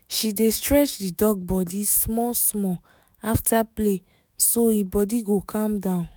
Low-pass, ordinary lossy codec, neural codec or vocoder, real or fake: none; none; autoencoder, 48 kHz, 128 numbers a frame, DAC-VAE, trained on Japanese speech; fake